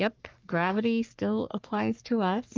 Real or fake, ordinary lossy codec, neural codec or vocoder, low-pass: fake; Opus, 32 kbps; codec, 44.1 kHz, 3.4 kbps, Pupu-Codec; 7.2 kHz